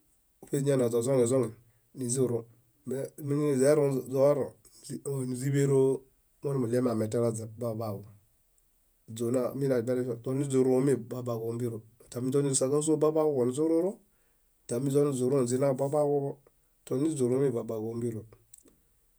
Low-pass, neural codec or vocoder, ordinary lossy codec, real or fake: none; vocoder, 48 kHz, 128 mel bands, Vocos; none; fake